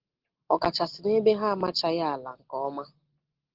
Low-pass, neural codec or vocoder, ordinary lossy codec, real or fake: 5.4 kHz; none; Opus, 16 kbps; real